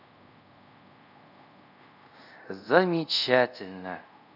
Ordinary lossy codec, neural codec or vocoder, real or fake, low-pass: none; codec, 24 kHz, 0.5 kbps, DualCodec; fake; 5.4 kHz